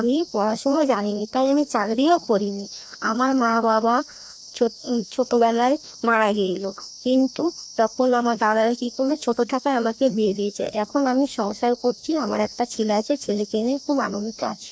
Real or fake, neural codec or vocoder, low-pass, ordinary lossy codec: fake; codec, 16 kHz, 1 kbps, FreqCodec, larger model; none; none